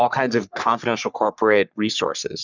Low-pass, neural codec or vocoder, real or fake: 7.2 kHz; codec, 44.1 kHz, 3.4 kbps, Pupu-Codec; fake